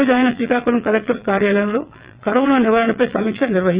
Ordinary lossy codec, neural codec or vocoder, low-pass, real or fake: Opus, 64 kbps; vocoder, 22.05 kHz, 80 mel bands, WaveNeXt; 3.6 kHz; fake